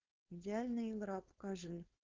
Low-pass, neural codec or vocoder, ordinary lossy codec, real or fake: 7.2 kHz; codec, 16 kHz, 4.8 kbps, FACodec; Opus, 16 kbps; fake